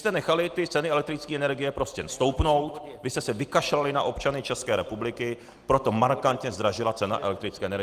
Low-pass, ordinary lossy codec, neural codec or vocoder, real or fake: 14.4 kHz; Opus, 24 kbps; none; real